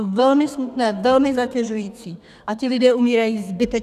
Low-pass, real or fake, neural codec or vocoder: 14.4 kHz; fake; codec, 32 kHz, 1.9 kbps, SNAC